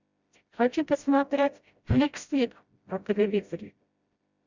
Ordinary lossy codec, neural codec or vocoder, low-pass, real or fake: Opus, 64 kbps; codec, 16 kHz, 0.5 kbps, FreqCodec, smaller model; 7.2 kHz; fake